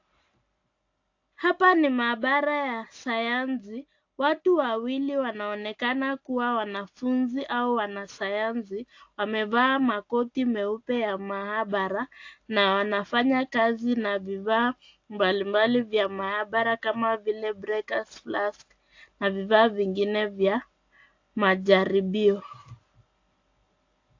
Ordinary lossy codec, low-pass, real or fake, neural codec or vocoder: AAC, 48 kbps; 7.2 kHz; real; none